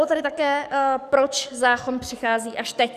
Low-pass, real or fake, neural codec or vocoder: 14.4 kHz; fake; codec, 44.1 kHz, 7.8 kbps, DAC